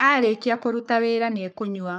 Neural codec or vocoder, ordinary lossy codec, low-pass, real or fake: codec, 44.1 kHz, 3.4 kbps, Pupu-Codec; none; 10.8 kHz; fake